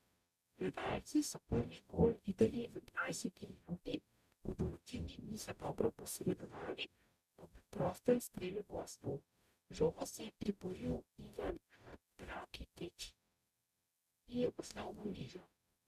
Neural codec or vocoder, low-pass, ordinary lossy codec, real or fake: codec, 44.1 kHz, 0.9 kbps, DAC; 14.4 kHz; none; fake